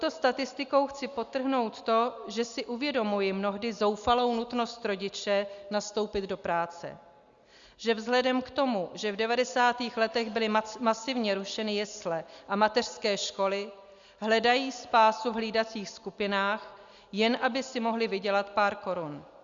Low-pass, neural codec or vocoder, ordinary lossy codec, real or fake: 7.2 kHz; none; Opus, 64 kbps; real